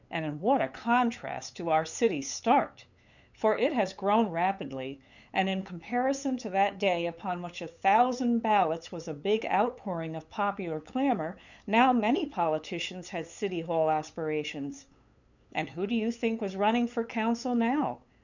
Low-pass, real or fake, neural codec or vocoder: 7.2 kHz; fake; codec, 16 kHz, 8 kbps, FunCodec, trained on LibriTTS, 25 frames a second